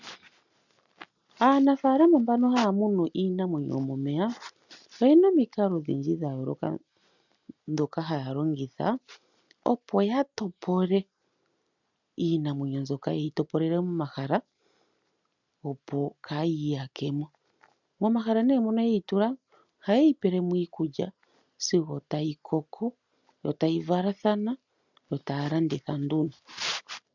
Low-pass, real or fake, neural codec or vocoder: 7.2 kHz; real; none